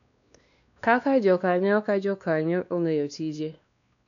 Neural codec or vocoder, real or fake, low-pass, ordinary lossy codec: codec, 16 kHz, 2 kbps, X-Codec, WavLM features, trained on Multilingual LibriSpeech; fake; 7.2 kHz; none